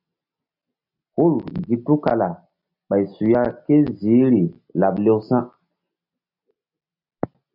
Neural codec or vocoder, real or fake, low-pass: none; real; 5.4 kHz